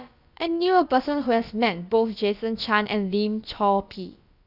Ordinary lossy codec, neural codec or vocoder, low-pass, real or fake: none; codec, 16 kHz, about 1 kbps, DyCAST, with the encoder's durations; 5.4 kHz; fake